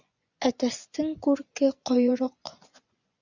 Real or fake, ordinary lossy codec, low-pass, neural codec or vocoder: fake; Opus, 64 kbps; 7.2 kHz; vocoder, 24 kHz, 100 mel bands, Vocos